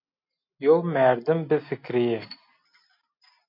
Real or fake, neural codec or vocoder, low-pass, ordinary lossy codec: real; none; 5.4 kHz; MP3, 32 kbps